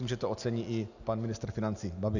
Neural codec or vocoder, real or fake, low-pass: none; real; 7.2 kHz